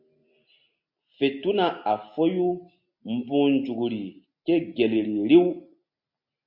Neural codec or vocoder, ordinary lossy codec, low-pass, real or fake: none; MP3, 48 kbps; 5.4 kHz; real